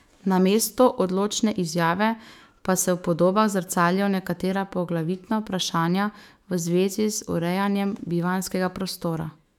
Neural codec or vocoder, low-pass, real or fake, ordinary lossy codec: autoencoder, 48 kHz, 128 numbers a frame, DAC-VAE, trained on Japanese speech; 19.8 kHz; fake; none